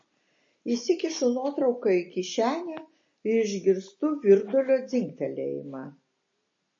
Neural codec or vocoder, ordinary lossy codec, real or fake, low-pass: none; MP3, 32 kbps; real; 7.2 kHz